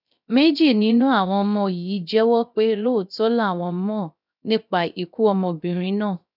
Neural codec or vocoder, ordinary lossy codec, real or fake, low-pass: codec, 16 kHz, 0.3 kbps, FocalCodec; none; fake; 5.4 kHz